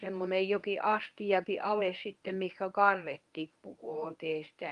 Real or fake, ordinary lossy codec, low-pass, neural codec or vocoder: fake; Opus, 32 kbps; 10.8 kHz; codec, 24 kHz, 0.9 kbps, WavTokenizer, medium speech release version 1